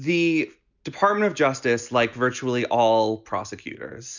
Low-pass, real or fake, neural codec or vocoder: 7.2 kHz; real; none